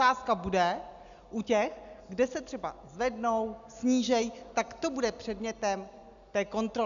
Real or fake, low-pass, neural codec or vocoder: real; 7.2 kHz; none